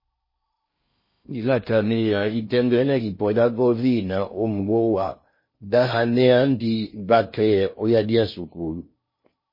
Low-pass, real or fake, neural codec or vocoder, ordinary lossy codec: 5.4 kHz; fake; codec, 16 kHz in and 24 kHz out, 0.6 kbps, FocalCodec, streaming, 2048 codes; MP3, 24 kbps